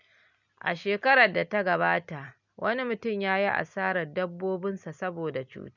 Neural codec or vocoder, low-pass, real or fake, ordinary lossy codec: none; 7.2 kHz; real; none